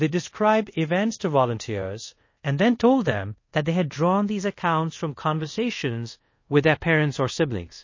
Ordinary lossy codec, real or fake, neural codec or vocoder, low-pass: MP3, 32 kbps; fake; codec, 24 kHz, 0.5 kbps, DualCodec; 7.2 kHz